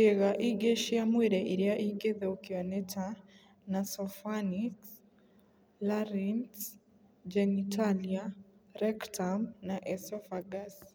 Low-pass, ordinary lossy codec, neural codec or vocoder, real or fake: none; none; none; real